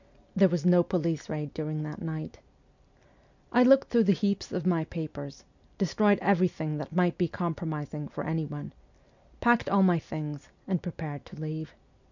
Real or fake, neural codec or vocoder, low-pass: real; none; 7.2 kHz